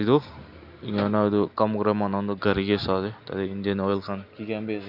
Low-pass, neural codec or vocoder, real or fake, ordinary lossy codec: 5.4 kHz; none; real; AAC, 48 kbps